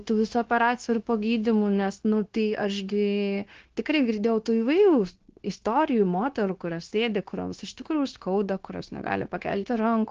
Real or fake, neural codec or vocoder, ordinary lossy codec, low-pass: fake; codec, 16 kHz, 0.9 kbps, LongCat-Audio-Codec; Opus, 16 kbps; 7.2 kHz